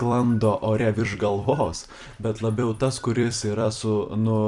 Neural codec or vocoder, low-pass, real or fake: vocoder, 44.1 kHz, 128 mel bands every 256 samples, BigVGAN v2; 10.8 kHz; fake